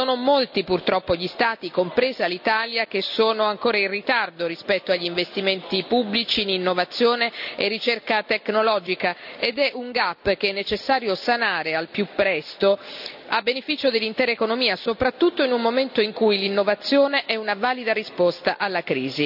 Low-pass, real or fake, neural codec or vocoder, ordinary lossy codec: 5.4 kHz; real; none; none